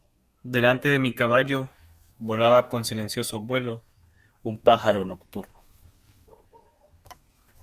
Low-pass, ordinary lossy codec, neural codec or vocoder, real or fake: 14.4 kHz; Opus, 64 kbps; codec, 32 kHz, 1.9 kbps, SNAC; fake